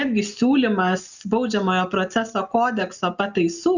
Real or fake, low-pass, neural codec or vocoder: real; 7.2 kHz; none